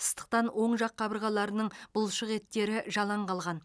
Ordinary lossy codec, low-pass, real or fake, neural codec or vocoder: none; none; real; none